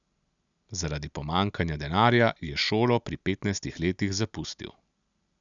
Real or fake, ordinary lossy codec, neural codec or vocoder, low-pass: real; none; none; 7.2 kHz